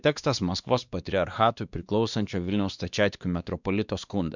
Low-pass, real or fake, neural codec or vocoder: 7.2 kHz; fake; codec, 16 kHz, 2 kbps, X-Codec, WavLM features, trained on Multilingual LibriSpeech